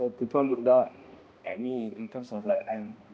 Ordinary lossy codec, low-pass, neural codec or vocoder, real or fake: none; none; codec, 16 kHz, 1 kbps, X-Codec, HuBERT features, trained on balanced general audio; fake